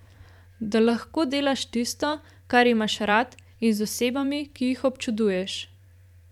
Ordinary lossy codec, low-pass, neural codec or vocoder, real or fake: none; 19.8 kHz; vocoder, 44.1 kHz, 128 mel bands every 512 samples, BigVGAN v2; fake